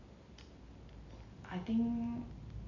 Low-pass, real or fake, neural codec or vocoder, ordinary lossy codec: 7.2 kHz; real; none; none